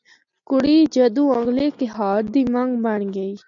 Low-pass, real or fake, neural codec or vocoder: 7.2 kHz; real; none